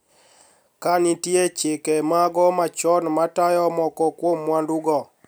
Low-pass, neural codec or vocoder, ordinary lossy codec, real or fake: none; none; none; real